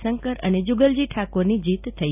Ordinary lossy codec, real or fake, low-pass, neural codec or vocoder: none; real; 3.6 kHz; none